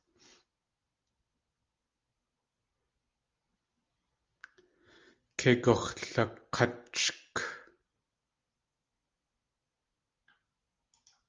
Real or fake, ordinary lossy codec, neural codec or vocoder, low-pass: real; Opus, 32 kbps; none; 7.2 kHz